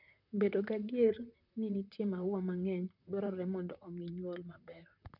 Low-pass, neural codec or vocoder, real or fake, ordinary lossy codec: 5.4 kHz; vocoder, 44.1 kHz, 128 mel bands, Pupu-Vocoder; fake; none